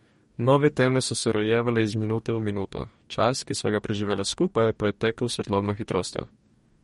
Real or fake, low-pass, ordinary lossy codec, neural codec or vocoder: fake; 19.8 kHz; MP3, 48 kbps; codec, 44.1 kHz, 2.6 kbps, DAC